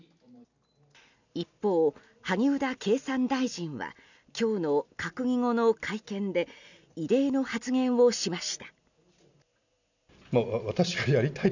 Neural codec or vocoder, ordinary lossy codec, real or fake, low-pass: none; none; real; 7.2 kHz